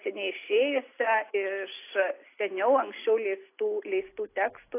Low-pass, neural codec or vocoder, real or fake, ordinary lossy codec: 3.6 kHz; none; real; AAC, 24 kbps